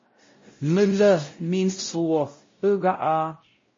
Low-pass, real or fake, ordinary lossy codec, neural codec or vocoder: 7.2 kHz; fake; MP3, 32 kbps; codec, 16 kHz, 0.5 kbps, X-Codec, WavLM features, trained on Multilingual LibriSpeech